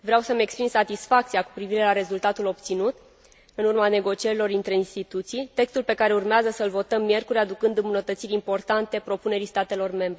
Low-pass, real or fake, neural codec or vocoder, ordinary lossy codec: none; real; none; none